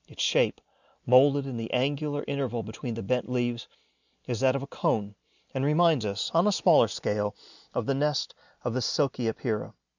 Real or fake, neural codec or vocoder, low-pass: real; none; 7.2 kHz